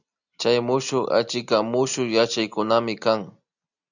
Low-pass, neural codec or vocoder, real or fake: 7.2 kHz; none; real